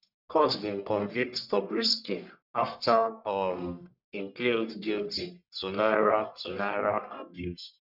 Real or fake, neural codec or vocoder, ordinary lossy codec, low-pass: fake; codec, 44.1 kHz, 1.7 kbps, Pupu-Codec; none; 5.4 kHz